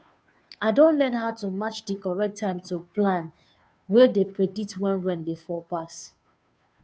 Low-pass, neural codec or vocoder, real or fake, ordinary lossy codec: none; codec, 16 kHz, 2 kbps, FunCodec, trained on Chinese and English, 25 frames a second; fake; none